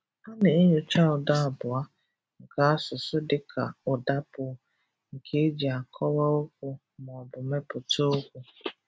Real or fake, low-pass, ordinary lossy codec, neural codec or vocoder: real; none; none; none